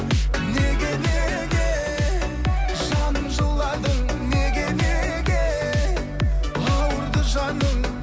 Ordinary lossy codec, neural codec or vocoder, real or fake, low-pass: none; none; real; none